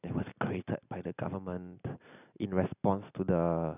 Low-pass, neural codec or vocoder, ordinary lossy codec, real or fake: 3.6 kHz; none; none; real